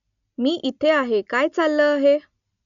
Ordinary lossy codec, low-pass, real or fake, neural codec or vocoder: none; 7.2 kHz; real; none